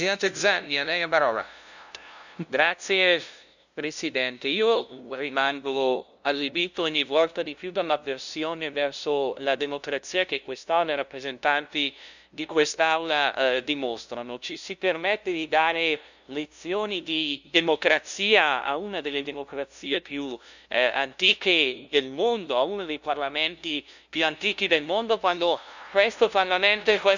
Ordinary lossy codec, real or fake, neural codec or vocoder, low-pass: none; fake; codec, 16 kHz, 0.5 kbps, FunCodec, trained on LibriTTS, 25 frames a second; 7.2 kHz